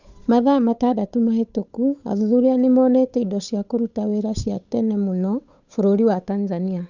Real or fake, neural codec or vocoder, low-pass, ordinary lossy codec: fake; codec, 16 kHz, 8 kbps, FunCodec, trained on Chinese and English, 25 frames a second; 7.2 kHz; none